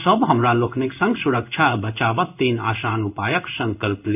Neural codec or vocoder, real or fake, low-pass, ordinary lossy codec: codec, 16 kHz in and 24 kHz out, 1 kbps, XY-Tokenizer; fake; 3.6 kHz; none